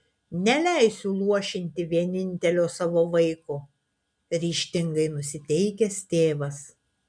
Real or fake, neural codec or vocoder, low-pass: real; none; 9.9 kHz